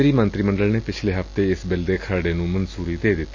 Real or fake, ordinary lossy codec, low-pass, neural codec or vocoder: real; AAC, 48 kbps; 7.2 kHz; none